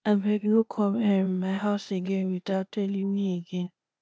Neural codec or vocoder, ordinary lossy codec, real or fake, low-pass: codec, 16 kHz, 0.8 kbps, ZipCodec; none; fake; none